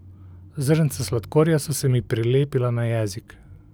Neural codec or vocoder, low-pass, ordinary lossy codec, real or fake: none; none; none; real